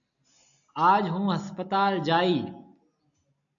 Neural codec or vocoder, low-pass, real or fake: none; 7.2 kHz; real